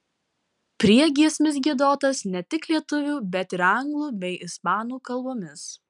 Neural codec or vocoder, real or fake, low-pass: none; real; 10.8 kHz